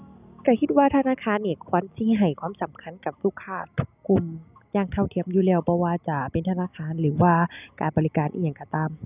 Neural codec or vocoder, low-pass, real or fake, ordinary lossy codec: none; 3.6 kHz; real; none